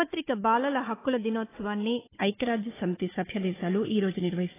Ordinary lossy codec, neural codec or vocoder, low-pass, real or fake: AAC, 16 kbps; codec, 16 kHz, 4 kbps, FunCodec, trained on Chinese and English, 50 frames a second; 3.6 kHz; fake